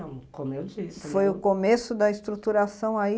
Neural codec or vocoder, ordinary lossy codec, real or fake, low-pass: none; none; real; none